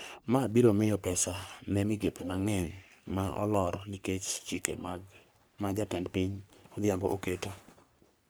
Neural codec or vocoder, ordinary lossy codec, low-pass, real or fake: codec, 44.1 kHz, 3.4 kbps, Pupu-Codec; none; none; fake